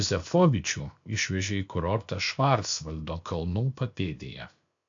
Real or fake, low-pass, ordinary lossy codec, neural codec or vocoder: fake; 7.2 kHz; AAC, 64 kbps; codec, 16 kHz, 0.7 kbps, FocalCodec